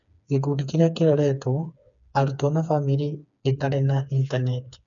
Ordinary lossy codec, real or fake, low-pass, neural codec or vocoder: none; fake; 7.2 kHz; codec, 16 kHz, 4 kbps, FreqCodec, smaller model